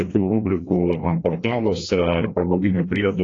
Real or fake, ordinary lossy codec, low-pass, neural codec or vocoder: fake; AAC, 32 kbps; 7.2 kHz; codec, 16 kHz, 1 kbps, FreqCodec, larger model